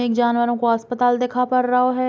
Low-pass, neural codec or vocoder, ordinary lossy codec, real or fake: none; none; none; real